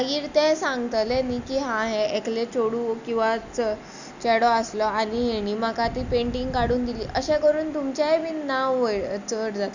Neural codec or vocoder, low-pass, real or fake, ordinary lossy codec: none; 7.2 kHz; real; none